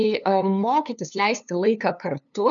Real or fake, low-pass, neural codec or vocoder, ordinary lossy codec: fake; 7.2 kHz; codec, 16 kHz, 2 kbps, FunCodec, trained on LibriTTS, 25 frames a second; MP3, 96 kbps